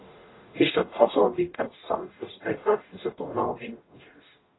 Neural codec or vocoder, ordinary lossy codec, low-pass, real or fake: codec, 44.1 kHz, 0.9 kbps, DAC; AAC, 16 kbps; 7.2 kHz; fake